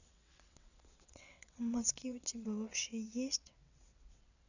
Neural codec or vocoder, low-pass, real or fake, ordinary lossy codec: vocoder, 22.05 kHz, 80 mel bands, WaveNeXt; 7.2 kHz; fake; none